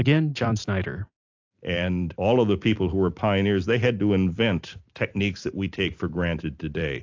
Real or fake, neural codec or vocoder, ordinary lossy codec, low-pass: fake; vocoder, 44.1 kHz, 128 mel bands every 256 samples, BigVGAN v2; AAC, 48 kbps; 7.2 kHz